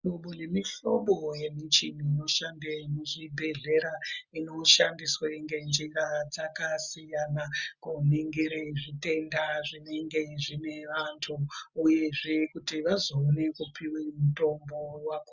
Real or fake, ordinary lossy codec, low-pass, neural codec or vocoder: real; Opus, 64 kbps; 7.2 kHz; none